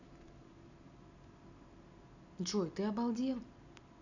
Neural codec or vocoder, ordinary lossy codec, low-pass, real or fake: none; none; 7.2 kHz; real